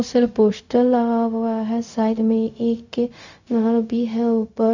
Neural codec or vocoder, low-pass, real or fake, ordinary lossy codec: codec, 16 kHz, 0.4 kbps, LongCat-Audio-Codec; 7.2 kHz; fake; none